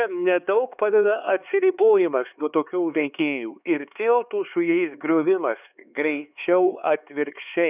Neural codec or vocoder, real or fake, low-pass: codec, 16 kHz, 4 kbps, X-Codec, HuBERT features, trained on LibriSpeech; fake; 3.6 kHz